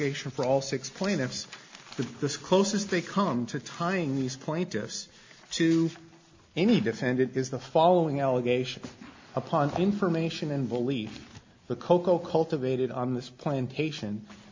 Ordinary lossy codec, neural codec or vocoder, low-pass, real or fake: MP3, 48 kbps; none; 7.2 kHz; real